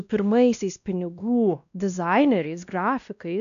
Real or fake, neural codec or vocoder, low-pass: fake; codec, 16 kHz, 1 kbps, X-Codec, WavLM features, trained on Multilingual LibriSpeech; 7.2 kHz